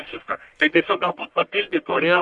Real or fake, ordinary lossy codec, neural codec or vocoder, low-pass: fake; MP3, 64 kbps; codec, 44.1 kHz, 1.7 kbps, Pupu-Codec; 10.8 kHz